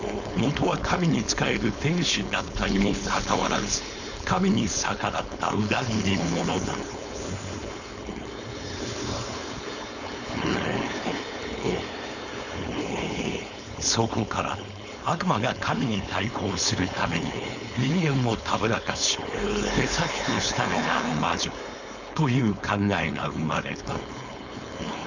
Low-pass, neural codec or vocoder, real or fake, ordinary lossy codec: 7.2 kHz; codec, 16 kHz, 4.8 kbps, FACodec; fake; none